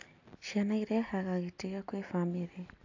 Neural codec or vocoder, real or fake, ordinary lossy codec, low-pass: none; real; AAC, 48 kbps; 7.2 kHz